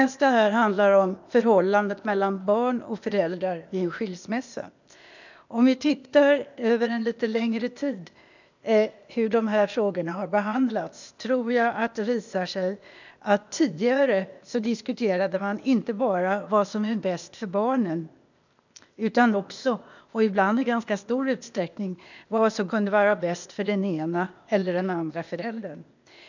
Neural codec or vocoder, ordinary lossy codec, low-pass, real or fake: codec, 16 kHz, 0.8 kbps, ZipCodec; none; 7.2 kHz; fake